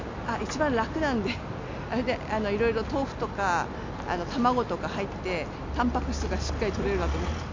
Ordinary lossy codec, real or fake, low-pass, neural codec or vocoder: MP3, 64 kbps; real; 7.2 kHz; none